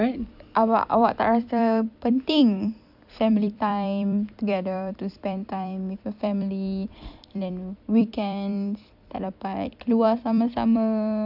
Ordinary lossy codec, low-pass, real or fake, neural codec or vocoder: none; 5.4 kHz; fake; vocoder, 44.1 kHz, 128 mel bands every 256 samples, BigVGAN v2